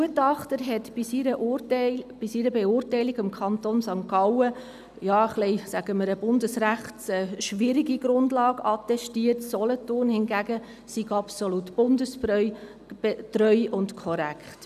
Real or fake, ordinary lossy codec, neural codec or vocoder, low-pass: real; none; none; 14.4 kHz